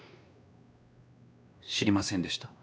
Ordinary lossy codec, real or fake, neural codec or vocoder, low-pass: none; fake; codec, 16 kHz, 2 kbps, X-Codec, WavLM features, trained on Multilingual LibriSpeech; none